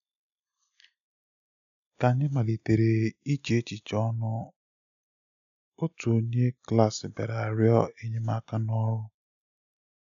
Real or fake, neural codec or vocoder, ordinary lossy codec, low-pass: real; none; none; 7.2 kHz